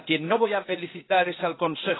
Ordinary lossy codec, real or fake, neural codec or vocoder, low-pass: AAC, 16 kbps; fake; codec, 16 kHz, 0.8 kbps, ZipCodec; 7.2 kHz